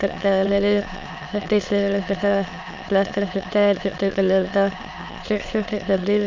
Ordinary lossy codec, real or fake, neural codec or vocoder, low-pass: MP3, 64 kbps; fake; autoencoder, 22.05 kHz, a latent of 192 numbers a frame, VITS, trained on many speakers; 7.2 kHz